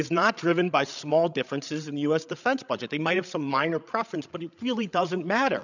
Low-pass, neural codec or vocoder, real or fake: 7.2 kHz; codec, 16 kHz, 16 kbps, FreqCodec, larger model; fake